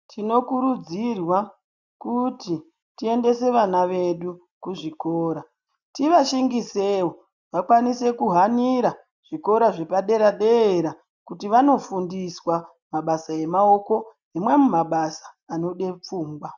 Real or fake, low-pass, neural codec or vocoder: real; 7.2 kHz; none